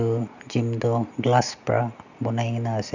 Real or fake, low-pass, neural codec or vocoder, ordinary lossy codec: real; 7.2 kHz; none; none